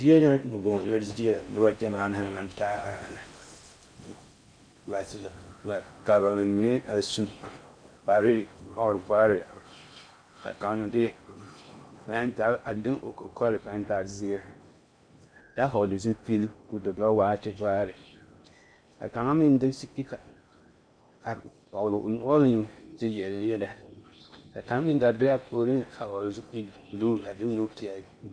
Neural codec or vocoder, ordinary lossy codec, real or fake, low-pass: codec, 16 kHz in and 24 kHz out, 0.6 kbps, FocalCodec, streaming, 4096 codes; MP3, 64 kbps; fake; 9.9 kHz